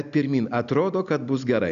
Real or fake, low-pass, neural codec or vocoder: real; 7.2 kHz; none